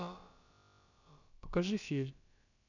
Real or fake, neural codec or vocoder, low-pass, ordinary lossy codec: fake; codec, 16 kHz, about 1 kbps, DyCAST, with the encoder's durations; 7.2 kHz; none